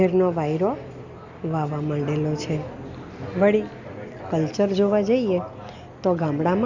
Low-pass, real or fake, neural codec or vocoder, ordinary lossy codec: 7.2 kHz; real; none; none